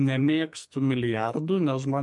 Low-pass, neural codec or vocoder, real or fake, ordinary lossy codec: 10.8 kHz; codec, 44.1 kHz, 2.6 kbps, SNAC; fake; MP3, 64 kbps